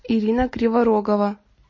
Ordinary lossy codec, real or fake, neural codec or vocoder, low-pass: MP3, 32 kbps; real; none; 7.2 kHz